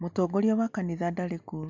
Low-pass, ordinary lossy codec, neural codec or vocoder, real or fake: 7.2 kHz; MP3, 64 kbps; none; real